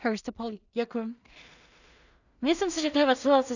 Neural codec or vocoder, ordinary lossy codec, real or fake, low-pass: codec, 16 kHz in and 24 kHz out, 0.4 kbps, LongCat-Audio-Codec, two codebook decoder; none; fake; 7.2 kHz